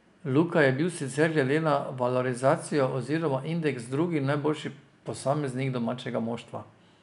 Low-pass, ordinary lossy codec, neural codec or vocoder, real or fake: 10.8 kHz; none; none; real